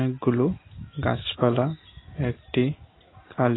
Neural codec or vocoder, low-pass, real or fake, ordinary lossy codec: none; 7.2 kHz; real; AAC, 16 kbps